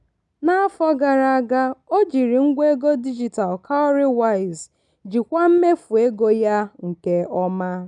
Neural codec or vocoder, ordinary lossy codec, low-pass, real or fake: none; none; 10.8 kHz; real